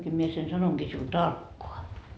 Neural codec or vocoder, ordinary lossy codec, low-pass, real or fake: none; none; none; real